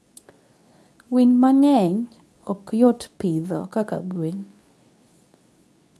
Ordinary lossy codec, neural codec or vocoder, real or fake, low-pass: none; codec, 24 kHz, 0.9 kbps, WavTokenizer, medium speech release version 2; fake; none